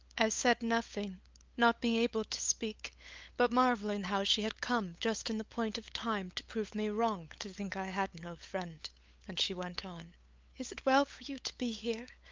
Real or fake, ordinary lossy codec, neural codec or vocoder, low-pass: fake; Opus, 24 kbps; codec, 16 kHz, 8 kbps, FunCodec, trained on LibriTTS, 25 frames a second; 7.2 kHz